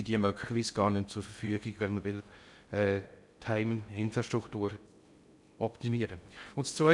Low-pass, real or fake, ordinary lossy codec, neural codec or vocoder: 10.8 kHz; fake; none; codec, 16 kHz in and 24 kHz out, 0.6 kbps, FocalCodec, streaming, 2048 codes